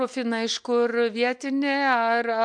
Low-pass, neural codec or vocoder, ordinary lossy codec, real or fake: 9.9 kHz; none; MP3, 64 kbps; real